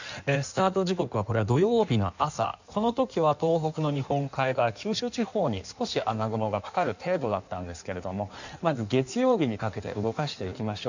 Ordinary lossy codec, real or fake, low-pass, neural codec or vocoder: none; fake; 7.2 kHz; codec, 16 kHz in and 24 kHz out, 1.1 kbps, FireRedTTS-2 codec